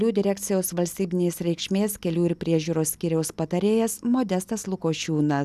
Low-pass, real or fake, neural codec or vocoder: 14.4 kHz; real; none